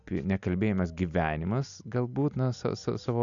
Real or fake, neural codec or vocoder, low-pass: real; none; 7.2 kHz